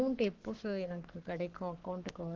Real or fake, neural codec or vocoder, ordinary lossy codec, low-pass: fake; codec, 44.1 kHz, 7.8 kbps, Pupu-Codec; Opus, 16 kbps; 7.2 kHz